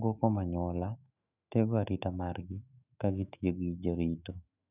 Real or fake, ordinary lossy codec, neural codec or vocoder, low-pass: fake; none; autoencoder, 48 kHz, 128 numbers a frame, DAC-VAE, trained on Japanese speech; 3.6 kHz